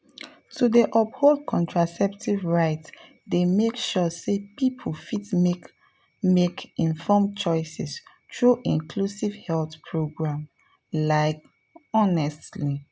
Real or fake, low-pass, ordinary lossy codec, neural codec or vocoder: real; none; none; none